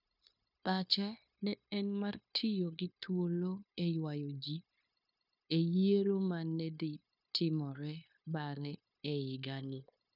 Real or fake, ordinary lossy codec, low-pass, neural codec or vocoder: fake; none; 5.4 kHz; codec, 16 kHz, 0.9 kbps, LongCat-Audio-Codec